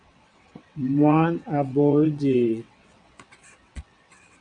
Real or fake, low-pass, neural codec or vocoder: fake; 9.9 kHz; vocoder, 22.05 kHz, 80 mel bands, WaveNeXt